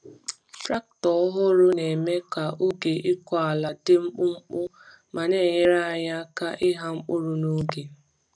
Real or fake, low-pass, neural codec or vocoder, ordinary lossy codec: real; 9.9 kHz; none; none